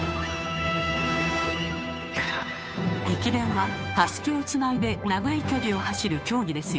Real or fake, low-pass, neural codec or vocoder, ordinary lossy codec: fake; none; codec, 16 kHz, 2 kbps, FunCodec, trained on Chinese and English, 25 frames a second; none